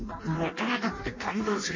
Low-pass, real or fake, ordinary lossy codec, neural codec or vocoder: 7.2 kHz; fake; MP3, 32 kbps; codec, 16 kHz in and 24 kHz out, 0.6 kbps, FireRedTTS-2 codec